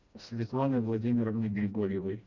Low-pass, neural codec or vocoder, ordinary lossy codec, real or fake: 7.2 kHz; codec, 16 kHz, 1 kbps, FreqCodec, smaller model; Opus, 64 kbps; fake